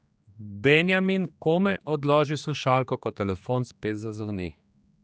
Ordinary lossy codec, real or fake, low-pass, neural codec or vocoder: none; fake; none; codec, 16 kHz, 2 kbps, X-Codec, HuBERT features, trained on general audio